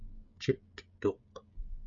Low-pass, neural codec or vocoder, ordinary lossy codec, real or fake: 7.2 kHz; codec, 16 kHz, 16 kbps, FreqCodec, smaller model; MP3, 48 kbps; fake